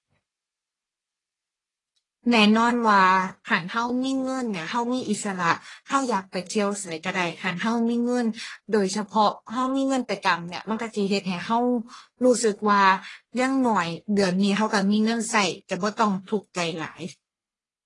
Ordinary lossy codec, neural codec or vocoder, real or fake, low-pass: AAC, 32 kbps; codec, 44.1 kHz, 1.7 kbps, Pupu-Codec; fake; 10.8 kHz